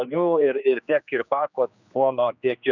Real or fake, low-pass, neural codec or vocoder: fake; 7.2 kHz; codec, 16 kHz, 2 kbps, X-Codec, HuBERT features, trained on general audio